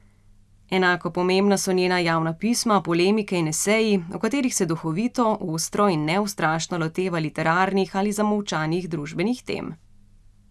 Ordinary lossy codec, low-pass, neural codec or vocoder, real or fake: none; none; none; real